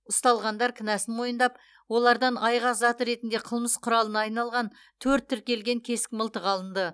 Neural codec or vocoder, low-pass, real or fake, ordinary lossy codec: none; none; real; none